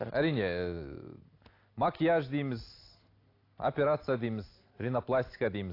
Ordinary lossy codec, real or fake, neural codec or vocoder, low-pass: AAC, 32 kbps; real; none; 5.4 kHz